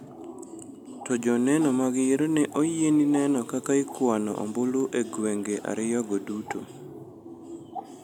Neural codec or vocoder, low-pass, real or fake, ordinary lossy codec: none; 19.8 kHz; real; none